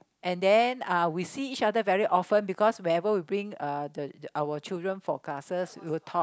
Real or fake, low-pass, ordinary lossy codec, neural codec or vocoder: real; none; none; none